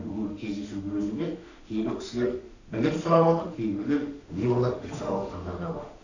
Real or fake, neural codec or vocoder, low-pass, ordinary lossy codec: fake; autoencoder, 48 kHz, 32 numbers a frame, DAC-VAE, trained on Japanese speech; 7.2 kHz; none